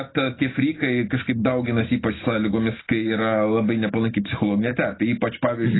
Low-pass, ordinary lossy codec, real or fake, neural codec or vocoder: 7.2 kHz; AAC, 16 kbps; real; none